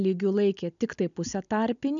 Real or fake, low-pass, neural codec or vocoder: real; 7.2 kHz; none